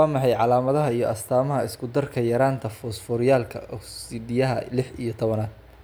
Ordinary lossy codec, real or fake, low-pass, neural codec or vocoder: none; real; none; none